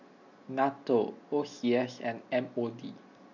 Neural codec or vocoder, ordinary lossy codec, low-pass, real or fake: none; none; 7.2 kHz; real